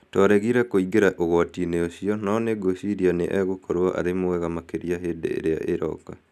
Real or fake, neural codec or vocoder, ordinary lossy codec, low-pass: real; none; none; 14.4 kHz